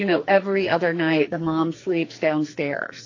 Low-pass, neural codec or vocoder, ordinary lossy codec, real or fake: 7.2 kHz; codec, 44.1 kHz, 2.6 kbps, SNAC; AAC, 32 kbps; fake